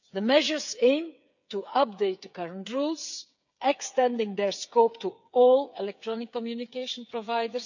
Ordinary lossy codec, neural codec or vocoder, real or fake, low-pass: none; codec, 16 kHz, 8 kbps, FreqCodec, smaller model; fake; 7.2 kHz